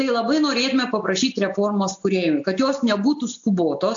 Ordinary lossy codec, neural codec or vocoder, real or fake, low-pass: AAC, 64 kbps; none; real; 7.2 kHz